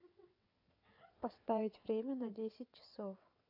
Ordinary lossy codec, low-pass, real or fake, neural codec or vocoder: AAC, 32 kbps; 5.4 kHz; fake; vocoder, 22.05 kHz, 80 mel bands, WaveNeXt